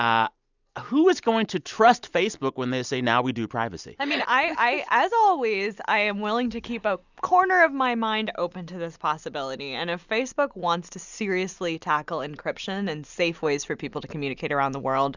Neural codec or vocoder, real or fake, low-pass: none; real; 7.2 kHz